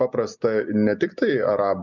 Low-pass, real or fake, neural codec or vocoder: 7.2 kHz; real; none